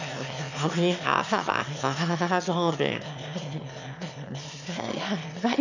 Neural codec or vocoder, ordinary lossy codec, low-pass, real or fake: autoencoder, 22.05 kHz, a latent of 192 numbers a frame, VITS, trained on one speaker; none; 7.2 kHz; fake